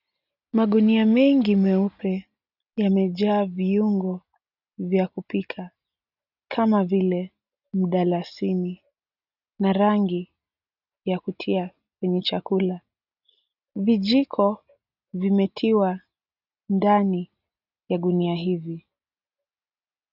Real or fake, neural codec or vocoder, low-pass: real; none; 5.4 kHz